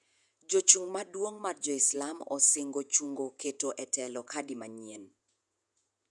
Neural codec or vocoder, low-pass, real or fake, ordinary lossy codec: vocoder, 48 kHz, 128 mel bands, Vocos; 10.8 kHz; fake; none